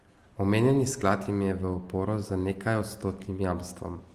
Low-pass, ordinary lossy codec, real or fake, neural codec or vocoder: 14.4 kHz; Opus, 24 kbps; real; none